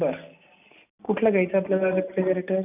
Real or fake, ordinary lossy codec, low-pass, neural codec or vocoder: real; none; 3.6 kHz; none